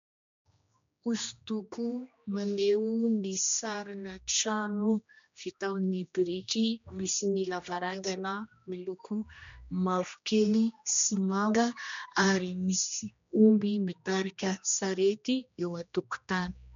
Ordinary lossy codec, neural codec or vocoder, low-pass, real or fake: MP3, 64 kbps; codec, 16 kHz, 1 kbps, X-Codec, HuBERT features, trained on general audio; 7.2 kHz; fake